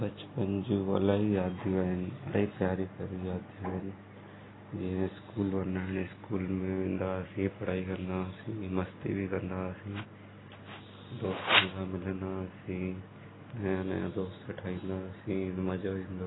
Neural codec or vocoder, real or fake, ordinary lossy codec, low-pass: none; real; AAC, 16 kbps; 7.2 kHz